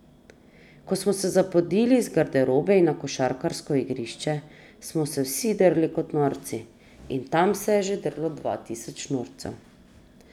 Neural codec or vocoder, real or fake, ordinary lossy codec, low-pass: none; real; none; 19.8 kHz